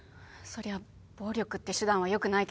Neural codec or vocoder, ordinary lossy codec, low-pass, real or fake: none; none; none; real